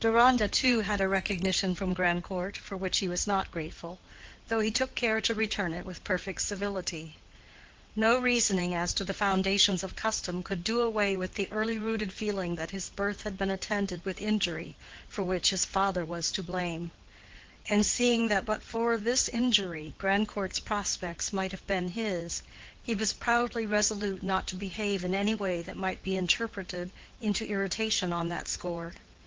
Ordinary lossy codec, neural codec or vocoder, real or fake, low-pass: Opus, 16 kbps; codec, 16 kHz in and 24 kHz out, 2.2 kbps, FireRedTTS-2 codec; fake; 7.2 kHz